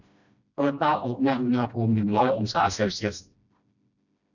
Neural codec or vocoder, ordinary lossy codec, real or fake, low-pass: codec, 16 kHz, 1 kbps, FreqCodec, smaller model; none; fake; 7.2 kHz